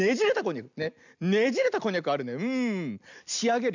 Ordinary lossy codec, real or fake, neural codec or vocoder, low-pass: none; real; none; 7.2 kHz